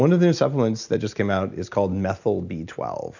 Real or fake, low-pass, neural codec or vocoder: real; 7.2 kHz; none